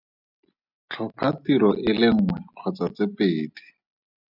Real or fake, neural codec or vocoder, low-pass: real; none; 5.4 kHz